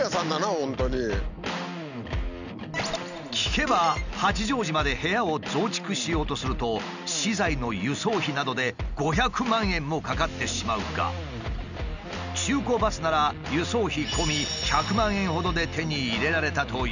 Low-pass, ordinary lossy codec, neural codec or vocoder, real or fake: 7.2 kHz; none; none; real